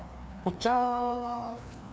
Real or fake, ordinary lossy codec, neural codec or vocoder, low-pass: fake; none; codec, 16 kHz, 2 kbps, FreqCodec, larger model; none